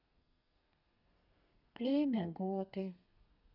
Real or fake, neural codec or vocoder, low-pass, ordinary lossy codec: fake; codec, 32 kHz, 1.9 kbps, SNAC; 5.4 kHz; none